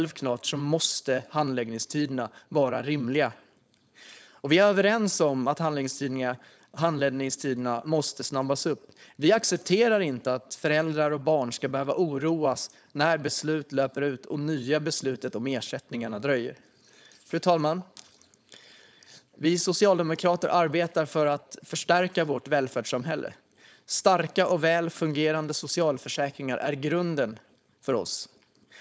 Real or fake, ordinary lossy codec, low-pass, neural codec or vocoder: fake; none; none; codec, 16 kHz, 4.8 kbps, FACodec